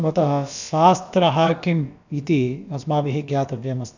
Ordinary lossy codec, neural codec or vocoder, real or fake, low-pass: none; codec, 16 kHz, about 1 kbps, DyCAST, with the encoder's durations; fake; 7.2 kHz